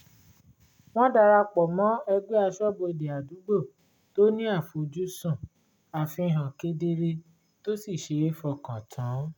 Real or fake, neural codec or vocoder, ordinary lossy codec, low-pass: real; none; none; 19.8 kHz